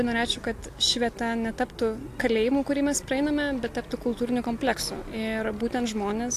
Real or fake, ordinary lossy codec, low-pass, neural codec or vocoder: real; AAC, 64 kbps; 14.4 kHz; none